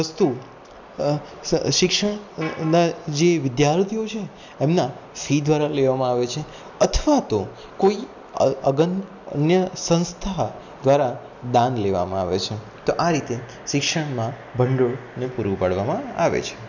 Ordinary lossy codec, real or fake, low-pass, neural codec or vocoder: none; real; 7.2 kHz; none